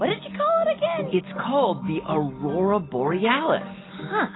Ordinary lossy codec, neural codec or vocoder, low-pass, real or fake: AAC, 16 kbps; none; 7.2 kHz; real